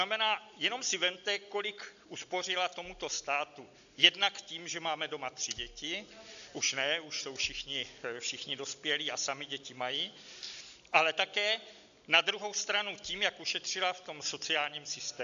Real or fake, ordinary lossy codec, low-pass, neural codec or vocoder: real; AAC, 96 kbps; 7.2 kHz; none